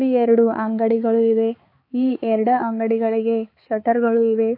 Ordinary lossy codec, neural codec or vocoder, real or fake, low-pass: AAC, 32 kbps; codec, 16 kHz, 4 kbps, X-Codec, HuBERT features, trained on balanced general audio; fake; 5.4 kHz